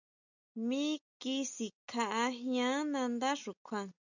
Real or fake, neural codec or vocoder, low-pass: real; none; 7.2 kHz